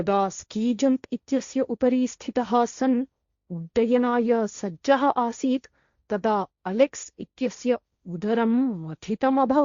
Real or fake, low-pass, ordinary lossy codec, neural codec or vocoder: fake; 7.2 kHz; Opus, 64 kbps; codec, 16 kHz, 1.1 kbps, Voila-Tokenizer